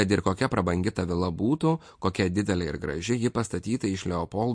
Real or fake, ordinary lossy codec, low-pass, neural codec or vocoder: real; MP3, 48 kbps; 9.9 kHz; none